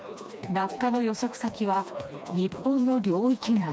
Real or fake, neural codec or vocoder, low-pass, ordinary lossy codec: fake; codec, 16 kHz, 2 kbps, FreqCodec, smaller model; none; none